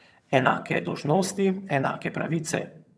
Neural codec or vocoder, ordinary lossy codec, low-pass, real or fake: vocoder, 22.05 kHz, 80 mel bands, HiFi-GAN; none; none; fake